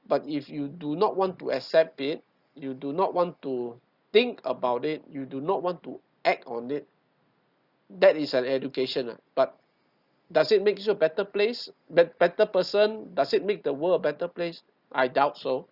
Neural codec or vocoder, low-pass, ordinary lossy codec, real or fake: none; 5.4 kHz; Opus, 64 kbps; real